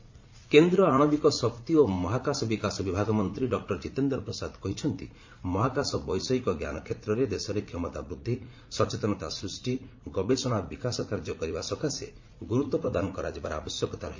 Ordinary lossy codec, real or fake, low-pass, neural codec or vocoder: MP3, 48 kbps; fake; 7.2 kHz; vocoder, 44.1 kHz, 80 mel bands, Vocos